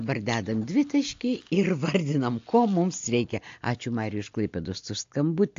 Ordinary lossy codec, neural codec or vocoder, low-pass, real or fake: AAC, 48 kbps; none; 7.2 kHz; real